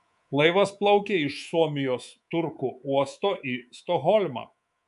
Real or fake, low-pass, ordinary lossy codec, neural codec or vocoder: fake; 10.8 kHz; MP3, 96 kbps; codec, 24 kHz, 3.1 kbps, DualCodec